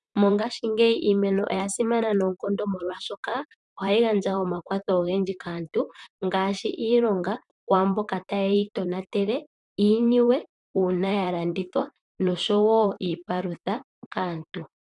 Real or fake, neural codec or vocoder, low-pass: fake; vocoder, 44.1 kHz, 128 mel bands, Pupu-Vocoder; 10.8 kHz